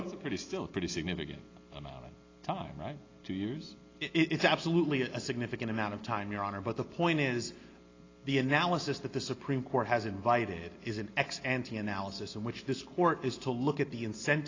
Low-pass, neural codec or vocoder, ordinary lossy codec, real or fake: 7.2 kHz; none; AAC, 32 kbps; real